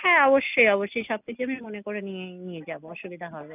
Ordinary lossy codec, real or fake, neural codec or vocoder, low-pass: none; real; none; 3.6 kHz